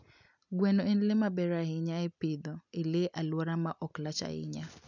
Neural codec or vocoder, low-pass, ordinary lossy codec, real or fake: none; 7.2 kHz; none; real